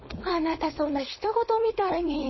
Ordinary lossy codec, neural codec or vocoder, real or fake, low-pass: MP3, 24 kbps; codec, 16 kHz, 4.8 kbps, FACodec; fake; 7.2 kHz